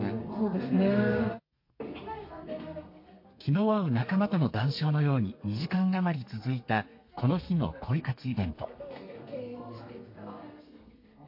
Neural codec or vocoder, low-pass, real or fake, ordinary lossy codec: codec, 32 kHz, 1.9 kbps, SNAC; 5.4 kHz; fake; MP3, 32 kbps